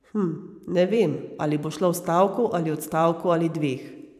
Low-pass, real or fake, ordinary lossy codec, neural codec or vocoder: 14.4 kHz; fake; none; vocoder, 44.1 kHz, 128 mel bands every 512 samples, BigVGAN v2